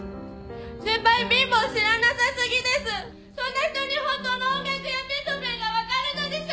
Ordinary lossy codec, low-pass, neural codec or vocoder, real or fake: none; none; none; real